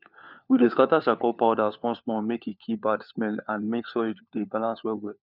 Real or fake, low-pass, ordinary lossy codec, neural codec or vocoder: fake; 5.4 kHz; none; codec, 16 kHz, 4 kbps, FunCodec, trained on LibriTTS, 50 frames a second